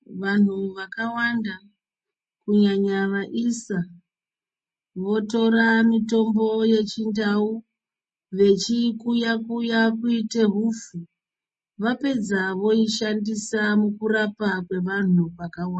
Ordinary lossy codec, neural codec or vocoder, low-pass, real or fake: MP3, 32 kbps; none; 10.8 kHz; real